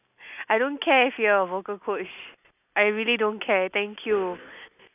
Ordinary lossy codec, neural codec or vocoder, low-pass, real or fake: none; none; 3.6 kHz; real